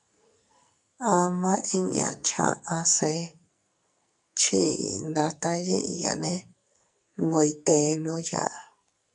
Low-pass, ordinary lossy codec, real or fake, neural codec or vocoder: 10.8 kHz; MP3, 96 kbps; fake; codec, 44.1 kHz, 2.6 kbps, SNAC